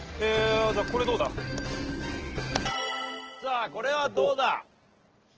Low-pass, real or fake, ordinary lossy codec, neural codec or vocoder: 7.2 kHz; real; Opus, 16 kbps; none